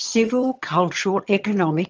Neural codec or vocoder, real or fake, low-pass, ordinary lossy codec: vocoder, 22.05 kHz, 80 mel bands, HiFi-GAN; fake; 7.2 kHz; Opus, 24 kbps